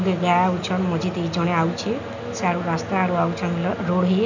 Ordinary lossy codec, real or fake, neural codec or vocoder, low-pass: none; real; none; 7.2 kHz